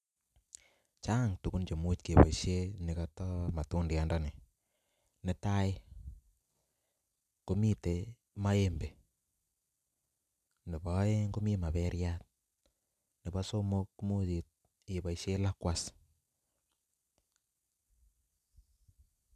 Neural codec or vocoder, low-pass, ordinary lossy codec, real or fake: none; 14.4 kHz; none; real